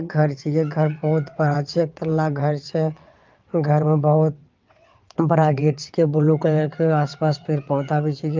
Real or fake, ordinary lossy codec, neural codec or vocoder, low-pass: fake; Opus, 24 kbps; vocoder, 22.05 kHz, 80 mel bands, WaveNeXt; 7.2 kHz